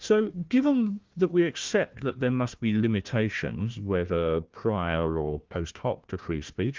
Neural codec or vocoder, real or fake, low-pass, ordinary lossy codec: codec, 16 kHz, 1 kbps, FunCodec, trained on Chinese and English, 50 frames a second; fake; 7.2 kHz; Opus, 32 kbps